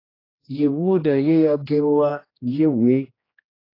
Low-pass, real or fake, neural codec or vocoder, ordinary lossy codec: 5.4 kHz; fake; codec, 16 kHz, 1 kbps, X-Codec, HuBERT features, trained on general audio; AAC, 24 kbps